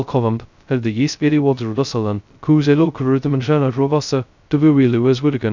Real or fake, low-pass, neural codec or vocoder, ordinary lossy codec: fake; 7.2 kHz; codec, 16 kHz, 0.2 kbps, FocalCodec; none